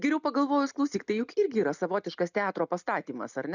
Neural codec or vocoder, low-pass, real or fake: none; 7.2 kHz; real